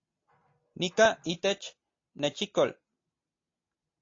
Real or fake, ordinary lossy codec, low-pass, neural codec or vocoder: real; AAC, 32 kbps; 7.2 kHz; none